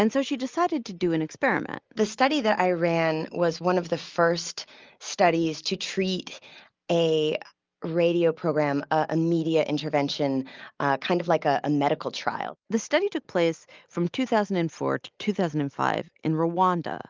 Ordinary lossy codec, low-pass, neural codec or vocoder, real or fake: Opus, 24 kbps; 7.2 kHz; none; real